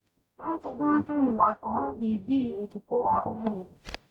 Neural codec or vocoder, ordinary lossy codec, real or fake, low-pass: codec, 44.1 kHz, 0.9 kbps, DAC; none; fake; 19.8 kHz